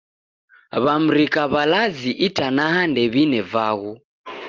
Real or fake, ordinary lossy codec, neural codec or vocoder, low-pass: real; Opus, 32 kbps; none; 7.2 kHz